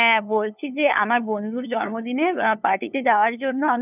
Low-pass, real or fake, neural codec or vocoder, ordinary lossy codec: 3.6 kHz; fake; codec, 16 kHz, 2 kbps, FunCodec, trained on LibriTTS, 25 frames a second; none